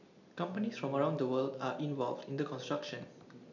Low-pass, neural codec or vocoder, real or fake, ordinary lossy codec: 7.2 kHz; none; real; none